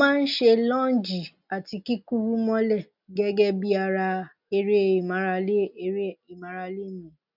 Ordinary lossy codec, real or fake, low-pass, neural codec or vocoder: none; real; 5.4 kHz; none